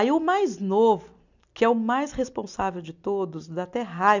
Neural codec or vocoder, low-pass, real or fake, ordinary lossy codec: none; 7.2 kHz; real; none